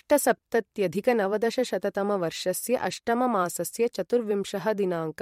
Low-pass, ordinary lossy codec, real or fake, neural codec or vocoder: 19.8 kHz; MP3, 64 kbps; fake; vocoder, 44.1 kHz, 128 mel bands every 512 samples, BigVGAN v2